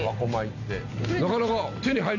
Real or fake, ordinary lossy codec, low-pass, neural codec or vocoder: real; none; 7.2 kHz; none